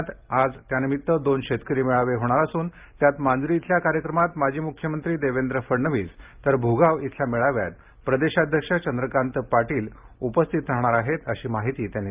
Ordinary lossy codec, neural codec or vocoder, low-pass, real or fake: Opus, 24 kbps; none; 3.6 kHz; real